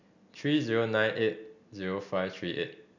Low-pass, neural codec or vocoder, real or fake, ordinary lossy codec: 7.2 kHz; none; real; none